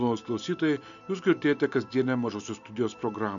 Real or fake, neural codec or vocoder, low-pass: real; none; 7.2 kHz